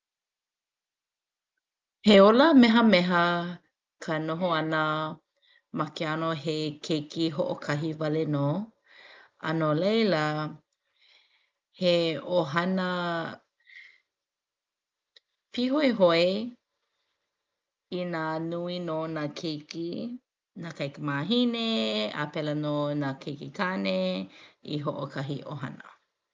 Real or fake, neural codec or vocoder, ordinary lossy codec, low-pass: real; none; Opus, 24 kbps; 7.2 kHz